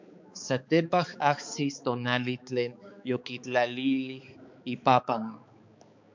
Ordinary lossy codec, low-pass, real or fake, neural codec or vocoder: MP3, 64 kbps; 7.2 kHz; fake; codec, 16 kHz, 4 kbps, X-Codec, HuBERT features, trained on balanced general audio